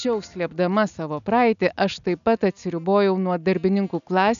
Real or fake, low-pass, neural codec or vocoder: real; 7.2 kHz; none